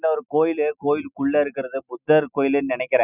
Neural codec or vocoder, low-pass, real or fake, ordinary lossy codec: none; 3.6 kHz; real; none